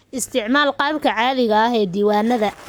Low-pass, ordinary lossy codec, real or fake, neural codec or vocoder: none; none; fake; codec, 44.1 kHz, 7.8 kbps, Pupu-Codec